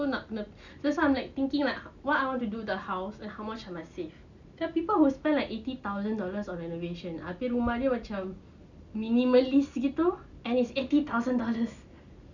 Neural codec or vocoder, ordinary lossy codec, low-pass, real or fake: none; none; 7.2 kHz; real